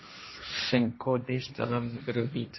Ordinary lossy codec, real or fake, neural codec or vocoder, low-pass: MP3, 24 kbps; fake; codec, 16 kHz, 1.1 kbps, Voila-Tokenizer; 7.2 kHz